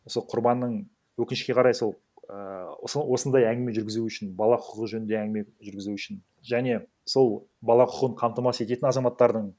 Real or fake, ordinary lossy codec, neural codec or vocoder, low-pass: real; none; none; none